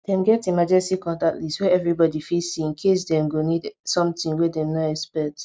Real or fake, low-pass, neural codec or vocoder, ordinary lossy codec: real; none; none; none